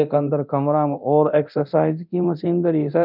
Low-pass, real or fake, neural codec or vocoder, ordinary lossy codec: 5.4 kHz; fake; codec, 24 kHz, 0.9 kbps, DualCodec; none